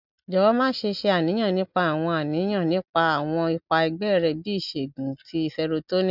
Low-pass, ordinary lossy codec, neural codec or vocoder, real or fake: 5.4 kHz; none; none; real